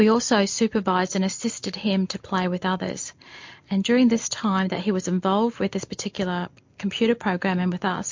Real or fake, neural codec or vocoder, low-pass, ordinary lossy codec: real; none; 7.2 kHz; MP3, 48 kbps